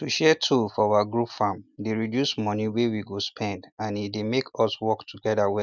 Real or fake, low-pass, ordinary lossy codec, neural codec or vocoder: real; 7.2 kHz; none; none